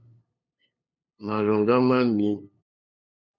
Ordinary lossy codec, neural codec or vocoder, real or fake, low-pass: MP3, 64 kbps; codec, 16 kHz, 2 kbps, FunCodec, trained on LibriTTS, 25 frames a second; fake; 7.2 kHz